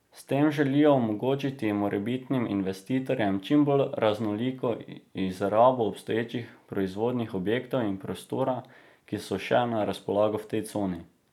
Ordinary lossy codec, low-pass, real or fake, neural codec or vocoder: none; 19.8 kHz; real; none